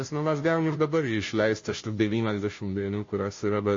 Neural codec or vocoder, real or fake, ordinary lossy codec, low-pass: codec, 16 kHz, 0.5 kbps, FunCodec, trained on Chinese and English, 25 frames a second; fake; MP3, 32 kbps; 7.2 kHz